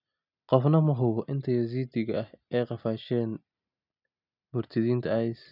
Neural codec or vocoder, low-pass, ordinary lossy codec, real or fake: none; 5.4 kHz; none; real